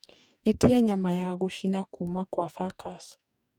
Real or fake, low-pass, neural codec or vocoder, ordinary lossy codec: fake; 19.8 kHz; codec, 44.1 kHz, 2.6 kbps, DAC; none